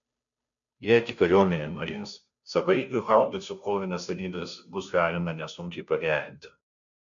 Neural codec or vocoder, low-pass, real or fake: codec, 16 kHz, 0.5 kbps, FunCodec, trained on Chinese and English, 25 frames a second; 7.2 kHz; fake